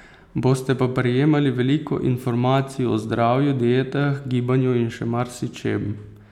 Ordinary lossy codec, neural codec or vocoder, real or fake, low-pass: none; none; real; 19.8 kHz